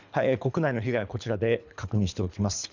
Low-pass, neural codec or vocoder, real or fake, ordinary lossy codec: 7.2 kHz; codec, 24 kHz, 3 kbps, HILCodec; fake; none